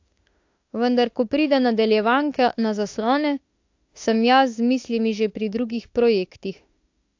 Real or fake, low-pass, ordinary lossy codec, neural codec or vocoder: fake; 7.2 kHz; AAC, 48 kbps; autoencoder, 48 kHz, 32 numbers a frame, DAC-VAE, trained on Japanese speech